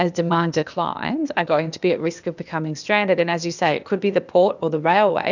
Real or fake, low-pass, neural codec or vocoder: fake; 7.2 kHz; codec, 16 kHz, 0.8 kbps, ZipCodec